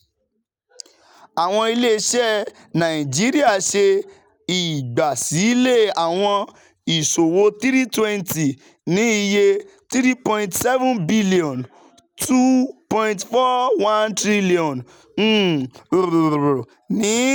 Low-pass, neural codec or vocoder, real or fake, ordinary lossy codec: none; none; real; none